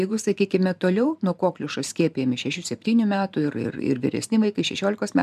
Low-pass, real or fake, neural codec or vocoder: 14.4 kHz; fake; vocoder, 44.1 kHz, 128 mel bands every 512 samples, BigVGAN v2